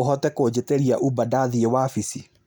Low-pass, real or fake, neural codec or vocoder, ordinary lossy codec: none; real; none; none